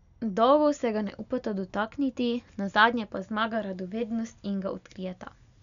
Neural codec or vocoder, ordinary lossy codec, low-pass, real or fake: none; none; 7.2 kHz; real